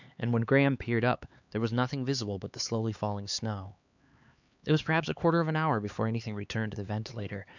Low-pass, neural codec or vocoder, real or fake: 7.2 kHz; codec, 16 kHz, 4 kbps, X-Codec, HuBERT features, trained on LibriSpeech; fake